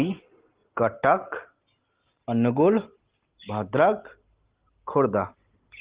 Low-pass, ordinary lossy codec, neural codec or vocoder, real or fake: 3.6 kHz; Opus, 16 kbps; none; real